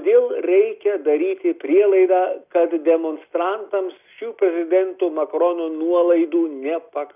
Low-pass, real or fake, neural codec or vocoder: 3.6 kHz; real; none